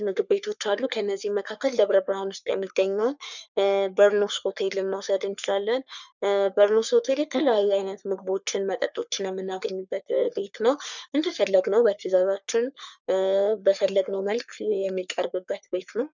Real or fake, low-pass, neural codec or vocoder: fake; 7.2 kHz; codec, 44.1 kHz, 3.4 kbps, Pupu-Codec